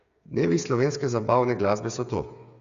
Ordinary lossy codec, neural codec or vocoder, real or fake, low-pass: Opus, 64 kbps; codec, 16 kHz, 8 kbps, FreqCodec, smaller model; fake; 7.2 kHz